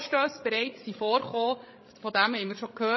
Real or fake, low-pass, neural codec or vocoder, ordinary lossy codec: fake; 7.2 kHz; codec, 16 kHz, 16 kbps, FreqCodec, larger model; MP3, 24 kbps